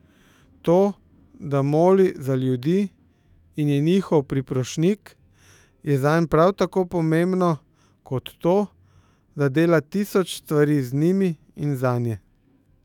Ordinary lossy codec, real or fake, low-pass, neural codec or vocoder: none; fake; 19.8 kHz; autoencoder, 48 kHz, 128 numbers a frame, DAC-VAE, trained on Japanese speech